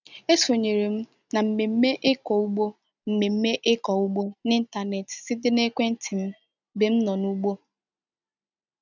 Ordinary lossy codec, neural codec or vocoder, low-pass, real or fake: none; none; 7.2 kHz; real